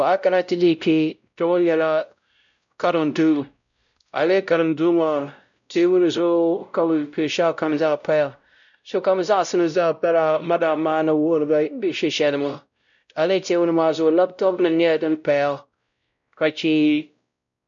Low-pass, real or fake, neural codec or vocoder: 7.2 kHz; fake; codec, 16 kHz, 0.5 kbps, X-Codec, WavLM features, trained on Multilingual LibriSpeech